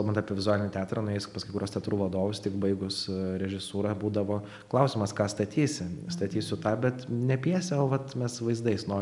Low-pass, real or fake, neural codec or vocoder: 10.8 kHz; real; none